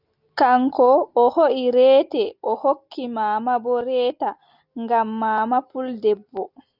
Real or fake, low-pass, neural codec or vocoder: real; 5.4 kHz; none